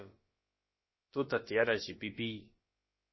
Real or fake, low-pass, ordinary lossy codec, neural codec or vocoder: fake; 7.2 kHz; MP3, 24 kbps; codec, 16 kHz, about 1 kbps, DyCAST, with the encoder's durations